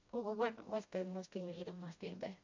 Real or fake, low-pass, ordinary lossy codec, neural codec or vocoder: fake; 7.2 kHz; MP3, 48 kbps; codec, 16 kHz, 1 kbps, FreqCodec, smaller model